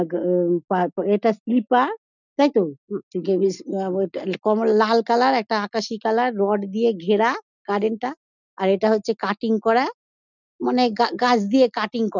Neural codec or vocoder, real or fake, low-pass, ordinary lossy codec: none; real; 7.2 kHz; none